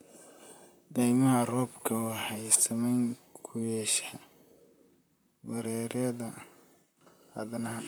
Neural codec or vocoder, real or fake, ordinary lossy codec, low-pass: vocoder, 44.1 kHz, 128 mel bands, Pupu-Vocoder; fake; none; none